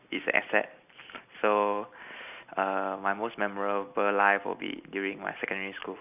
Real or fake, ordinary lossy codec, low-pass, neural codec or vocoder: real; none; 3.6 kHz; none